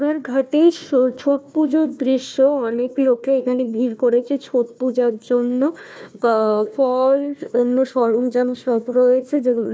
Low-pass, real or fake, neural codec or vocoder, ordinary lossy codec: none; fake; codec, 16 kHz, 1 kbps, FunCodec, trained on Chinese and English, 50 frames a second; none